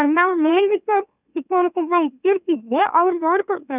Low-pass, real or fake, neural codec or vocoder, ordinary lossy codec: 3.6 kHz; fake; autoencoder, 44.1 kHz, a latent of 192 numbers a frame, MeloTTS; none